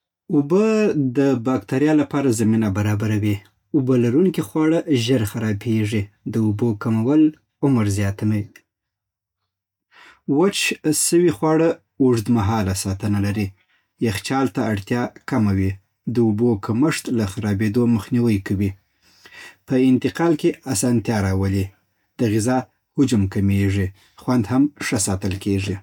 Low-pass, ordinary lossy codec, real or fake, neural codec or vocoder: 19.8 kHz; none; real; none